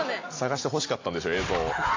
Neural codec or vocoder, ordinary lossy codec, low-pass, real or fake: none; AAC, 32 kbps; 7.2 kHz; real